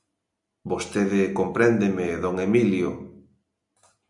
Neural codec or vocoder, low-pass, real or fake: none; 10.8 kHz; real